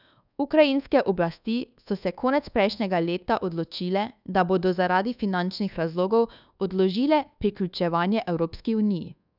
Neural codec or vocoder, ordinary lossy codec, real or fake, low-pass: codec, 24 kHz, 1.2 kbps, DualCodec; none; fake; 5.4 kHz